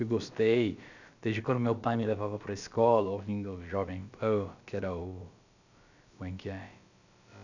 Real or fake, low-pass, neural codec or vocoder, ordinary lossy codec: fake; 7.2 kHz; codec, 16 kHz, about 1 kbps, DyCAST, with the encoder's durations; none